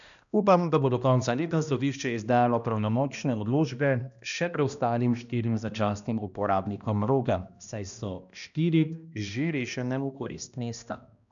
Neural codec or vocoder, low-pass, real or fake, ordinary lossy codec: codec, 16 kHz, 1 kbps, X-Codec, HuBERT features, trained on balanced general audio; 7.2 kHz; fake; none